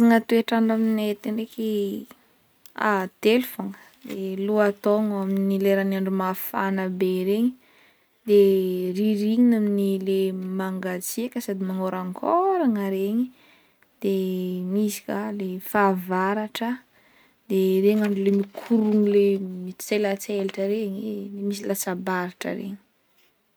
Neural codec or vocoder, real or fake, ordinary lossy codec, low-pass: none; real; none; none